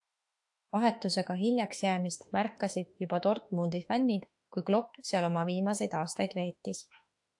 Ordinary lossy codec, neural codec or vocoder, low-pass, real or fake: AAC, 64 kbps; autoencoder, 48 kHz, 32 numbers a frame, DAC-VAE, trained on Japanese speech; 10.8 kHz; fake